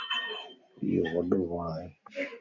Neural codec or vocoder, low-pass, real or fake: none; 7.2 kHz; real